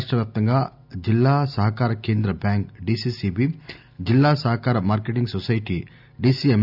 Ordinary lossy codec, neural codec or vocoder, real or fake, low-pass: none; none; real; 5.4 kHz